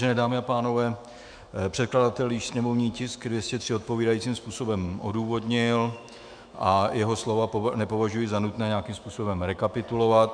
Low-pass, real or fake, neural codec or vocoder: 9.9 kHz; fake; autoencoder, 48 kHz, 128 numbers a frame, DAC-VAE, trained on Japanese speech